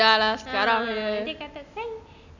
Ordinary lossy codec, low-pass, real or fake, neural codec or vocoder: none; 7.2 kHz; real; none